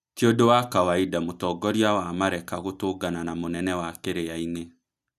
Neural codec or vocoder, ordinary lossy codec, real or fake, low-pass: none; none; real; 14.4 kHz